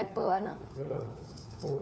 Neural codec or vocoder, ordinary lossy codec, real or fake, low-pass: codec, 16 kHz, 4 kbps, FunCodec, trained on LibriTTS, 50 frames a second; none; fake; none